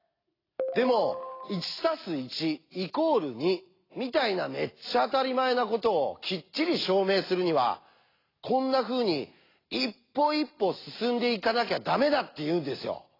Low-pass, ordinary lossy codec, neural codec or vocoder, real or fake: 5.4 kHz; AAC, 24 kbps; none; real